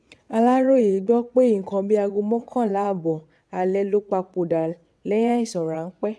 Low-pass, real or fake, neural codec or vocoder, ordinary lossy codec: none; fake; vocoder, 22.05 kHz, 80 mel bands, WaveNeXt; none